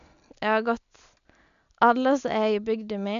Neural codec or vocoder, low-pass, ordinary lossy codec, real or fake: none; 7.2 kHz; none; real